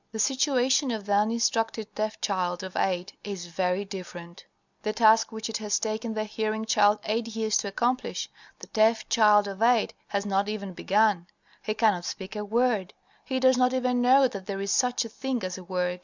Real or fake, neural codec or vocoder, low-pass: real; none; 7.2 kHz